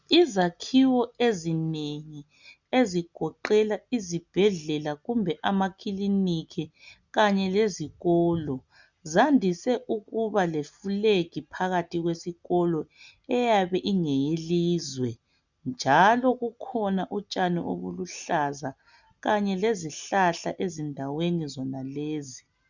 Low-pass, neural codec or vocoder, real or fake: 7.2 kHz; none; real